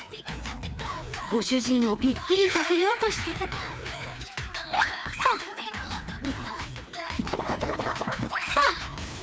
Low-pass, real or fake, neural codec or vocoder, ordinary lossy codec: none; fake; codec, 16 kHz, 2 kbps, FreqCodec, larger model; none